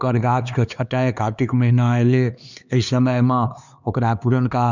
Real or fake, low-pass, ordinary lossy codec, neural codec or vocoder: fake; 7.2 kHz; none; codec, 16 kHz, 2 kbps, X-Codec, HuBERT features, trained on LibriSpeech